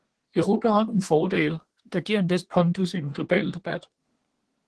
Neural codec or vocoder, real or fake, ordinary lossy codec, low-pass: codec, 24 kHz, 1 kbps, SNAC; fake; Opus, 16 kbps; 10.8 kHz